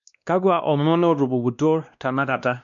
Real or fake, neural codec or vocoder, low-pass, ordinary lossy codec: fake; codec, 16 kHz, 1 kbps, X-Codec, WavLM features, trained on Multilingual LibriSpeech; 7.2 kHz; none